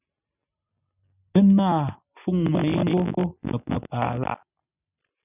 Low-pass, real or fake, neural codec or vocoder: 3.6 kHz; real; none